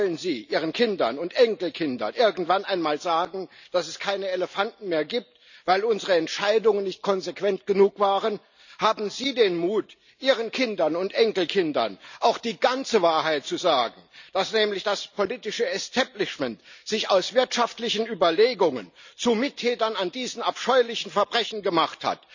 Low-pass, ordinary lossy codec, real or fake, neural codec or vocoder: 7.2 kHz; none; real; none